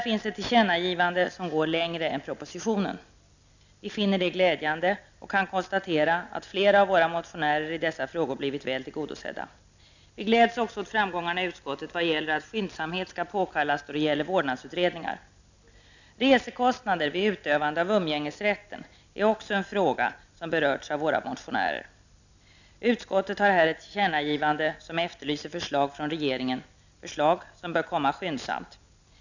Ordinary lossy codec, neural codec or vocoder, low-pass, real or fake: none; none; 7.2 kHz; real